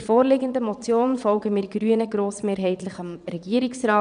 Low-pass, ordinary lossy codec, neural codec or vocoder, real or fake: 9.9 kHz; none; vocoder, 22.05 kHz, 80 mel bands, Vocos; fake